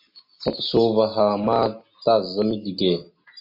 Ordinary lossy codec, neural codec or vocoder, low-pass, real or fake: MP3, 32 kbps; none; 5.4 kHz; real